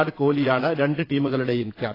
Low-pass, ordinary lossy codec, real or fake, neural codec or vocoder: 5.4 kHz; AAC, 24 kbps; fake; vocoder, 22.05 kHz, 80 mel bands, Vocos